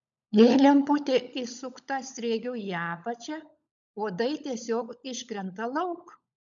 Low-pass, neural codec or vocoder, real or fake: 7.2 kHz; codec, 16 kHz, 16 kbps, FunCodec, trained on LibriTTS, 50 frames a second; fake